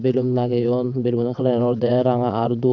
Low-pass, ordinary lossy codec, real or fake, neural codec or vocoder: 7.2 kHz; none; fake; vocoder, 22.05 kHz, 80 mel bands, WaveNeXt